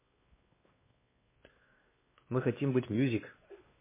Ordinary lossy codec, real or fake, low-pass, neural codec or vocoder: MP3, 16 kbps; fake; 3.6 kHz; codec, 16 kHz, 2 kbps, X-Codec, WavLM features, trained on Multilingual LibriSpeech